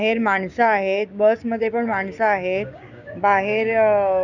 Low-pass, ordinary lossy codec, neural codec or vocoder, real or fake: 7.2 kHz; none; codec, 16 kHz, 6 kbps, DAC; fake